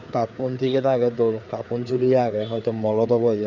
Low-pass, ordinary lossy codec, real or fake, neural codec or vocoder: 7.2 kHz; Opus, 64 kbps; fake; codec, 16 kHz, 4 kbps, FreqCodec, larger model